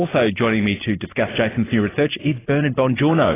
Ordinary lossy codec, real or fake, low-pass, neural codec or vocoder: AAC, 16 kbps; real; 3.6 kHz; none